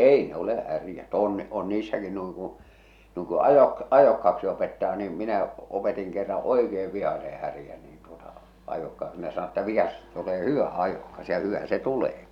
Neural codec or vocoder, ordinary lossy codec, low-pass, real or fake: none; none; 19.8 kHz; real